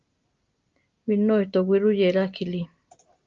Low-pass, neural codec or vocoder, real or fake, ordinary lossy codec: 7.2 kHz; none; real; Opus, 32 kbps